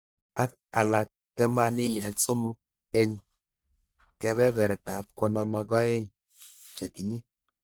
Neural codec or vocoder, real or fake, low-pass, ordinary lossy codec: codec, 44.1 kHz, 1.7 kbps, Pupu-Codec; fake; none; none